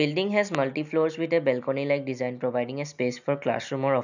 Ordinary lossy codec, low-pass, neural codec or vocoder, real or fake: none; 7.2 kHz; none; real